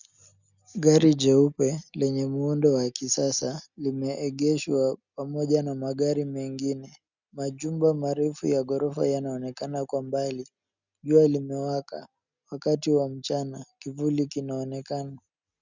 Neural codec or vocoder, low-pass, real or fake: none; 7.2 kHz; real